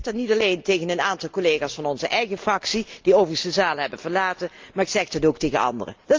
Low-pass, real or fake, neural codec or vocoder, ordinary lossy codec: 7.2 kHz; real; none; Opus, 24 kbps